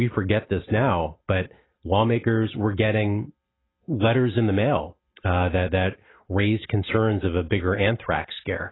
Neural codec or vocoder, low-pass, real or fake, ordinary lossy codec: none; 7.2 kHz; real; AAC, 16 kbps